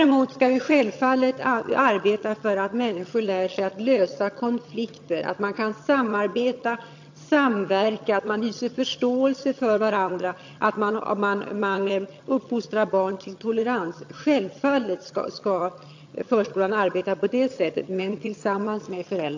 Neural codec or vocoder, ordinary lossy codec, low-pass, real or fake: vocoder, 22.05 kHz, 80 mel bands, HiFi-GAN; AAC, 48 kbps; 7.2 kHz; fake